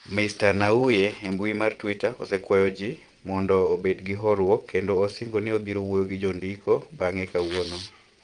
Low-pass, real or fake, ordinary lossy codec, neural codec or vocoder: 9.9 kHz; fake; Opus, 32 kbps; vocoder, 22.05 kHz, 80 mel bands, WaveNeXt